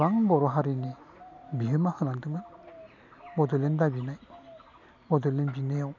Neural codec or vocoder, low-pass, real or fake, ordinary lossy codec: none; 7.2 kHz; real; none